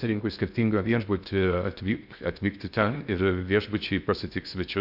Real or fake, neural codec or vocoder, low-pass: fake; codec, 16 kHz in and 24 kHz out, 0.6 kbps, FocalCodec, streaming, 2048 codes; 5.4 kHz